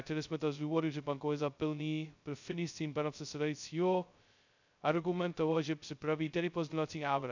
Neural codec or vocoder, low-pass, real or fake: codec, 16 kHz, 0.2 kbps, FocalCodec; 7.2 kHz; fake